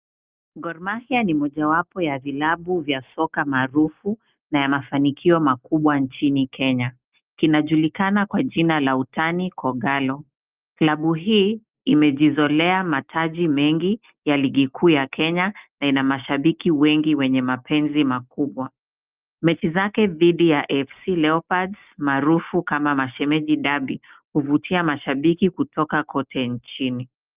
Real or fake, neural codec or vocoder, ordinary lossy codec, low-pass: real; none; Opus, 24 kbps; 3.6 kHz